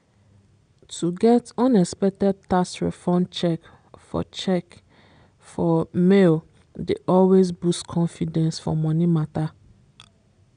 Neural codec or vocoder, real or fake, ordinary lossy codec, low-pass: none; real; none; 9.9 kHz